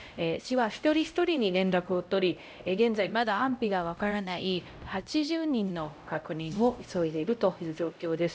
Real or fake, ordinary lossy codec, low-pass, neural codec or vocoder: fake; none; none; codec, 16 kHz, 0.5 kbps, X-Codec, HuBERT features, trained on LibriSpeech